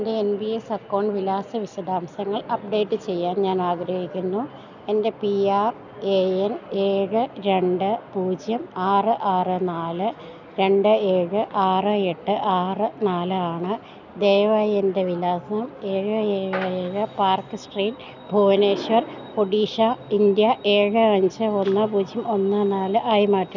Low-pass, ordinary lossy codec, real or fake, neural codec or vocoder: 7.2 kHz; none; real; none